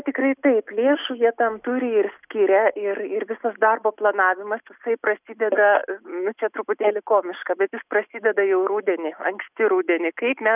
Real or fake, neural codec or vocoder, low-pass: real; none; 3.6 kHz